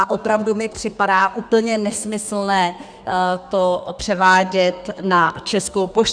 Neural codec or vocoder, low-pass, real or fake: codec, 32 kHz, 1.9 kbps, SNAC; 9.9 kHz; fake